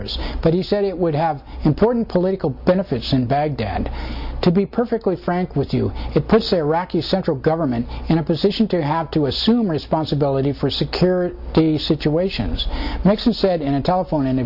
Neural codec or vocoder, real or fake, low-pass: none; real; 5.4 kHz